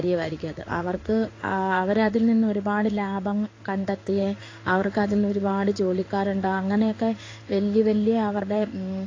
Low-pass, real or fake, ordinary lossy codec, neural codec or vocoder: 7.2 kHz; fake; AAC, 32 kbps; codec, 16 kHz in and 24 kHz out, 1 kbps, XY-Tokenizer